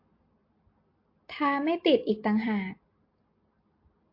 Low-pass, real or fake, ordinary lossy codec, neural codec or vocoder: 5.4 kHz; real; MP3, 32 kbps; none